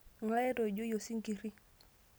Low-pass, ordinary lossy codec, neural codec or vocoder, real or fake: none; none; none; real